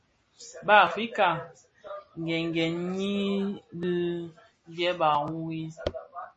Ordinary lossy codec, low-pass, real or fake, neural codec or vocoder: MP3, 32 kbps; 10.8 kHz; real; none